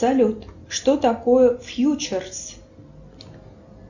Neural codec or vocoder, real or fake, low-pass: none; real; 7.2 kHz